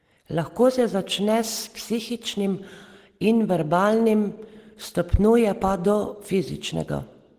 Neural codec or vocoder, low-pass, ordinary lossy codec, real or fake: none; 14.4 kHz; Opus, 16 kbps; real